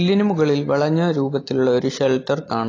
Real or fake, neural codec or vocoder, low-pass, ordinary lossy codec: real; none; 7.2 kHz; AAC, 32 kbps